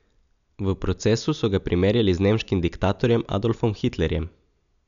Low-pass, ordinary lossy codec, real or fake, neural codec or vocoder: 7.2 kHz; none; real; none